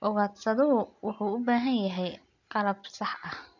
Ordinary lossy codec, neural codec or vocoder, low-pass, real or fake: none; none; 7.2 kHz; real